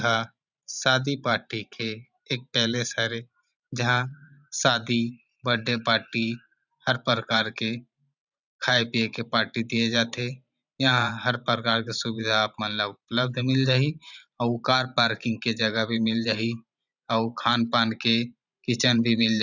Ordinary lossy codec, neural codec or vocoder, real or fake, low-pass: none; vocoder, 44.1 kHz, 128 mel bands every 512 samples, BigVGAN v2; fake; 7.2 kHz